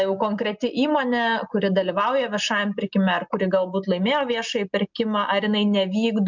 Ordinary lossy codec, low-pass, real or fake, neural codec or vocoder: MP3, 64 kbps; 7.2 kHz; real; none